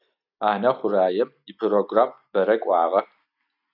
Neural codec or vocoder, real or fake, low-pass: none; real; 5.4 kHz